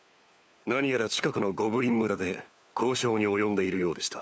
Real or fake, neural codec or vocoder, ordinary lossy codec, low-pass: fake; codec, 16 kHz, 4 kbps, FunCodec, trained on LibriTTS, 50 frames a second; none; none